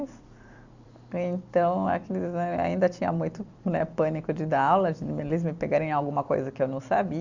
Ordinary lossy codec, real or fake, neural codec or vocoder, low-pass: Opus, 64 kbps; fake; vocoder, 44.1 kHz, 128 mel bands every 256 samples, BigVGAN v2; 7.2 kHz